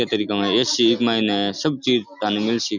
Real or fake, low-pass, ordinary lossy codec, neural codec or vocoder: real; 7.2 kHz; none; none